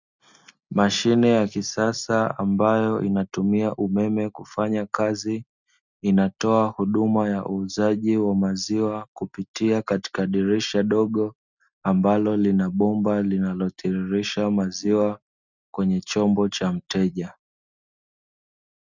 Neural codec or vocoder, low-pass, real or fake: none; 7.2 kHz; real